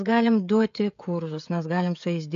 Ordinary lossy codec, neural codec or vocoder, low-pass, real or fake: AAC, 64 kbps; codec, 16 kHz, 16 kbps, FreqCodec, smaller model; 7.2 kHz; fake